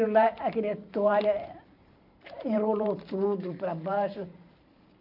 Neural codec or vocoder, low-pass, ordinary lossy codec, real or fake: vocoder, 44.1 kHz, 128 mel bands every 512 samples, BigVGAN v2; 5.4 kHz; none; fake